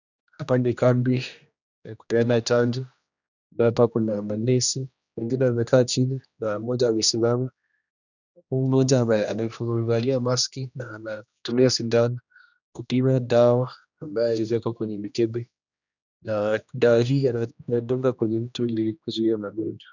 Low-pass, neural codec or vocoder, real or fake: 7.2 kHz; codec, 16 kHz, 1 kbps, X-Codec, HuBERT features, trained on general audio; fake